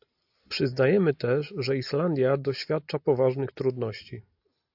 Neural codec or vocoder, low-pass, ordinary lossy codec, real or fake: none; 5.4 kHz; AAC, 48 kbps; real